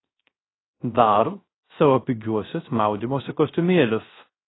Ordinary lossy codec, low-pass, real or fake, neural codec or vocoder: AAC, 16 kbps; 7.2 kHz; fake; codec, 16 kHz, 0.3 kbps, FocalCodec